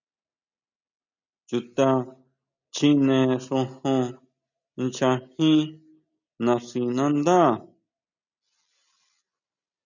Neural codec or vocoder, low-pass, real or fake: none; 7.2 kHz; real